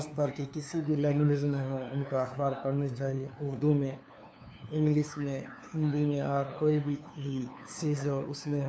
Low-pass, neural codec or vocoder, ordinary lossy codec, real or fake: none; codec, 16 kHz, 2 kbps, FunCodec, trained on LibriTTS, 25 frames a second; none; fake